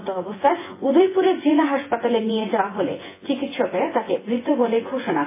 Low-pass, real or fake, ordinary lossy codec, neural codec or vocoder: 3.6 kHz; fake; MP3, 16 kbps; vocoder, 24 kHz, 100 mel bands, Vocos